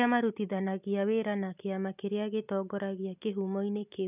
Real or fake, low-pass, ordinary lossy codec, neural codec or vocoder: real; 3.6 kHz; none; none